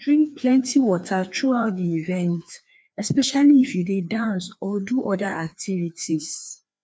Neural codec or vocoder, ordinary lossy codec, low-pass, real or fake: codec, 16 kHz, 2 kbps, FreqCodec, larger model; none; none; fake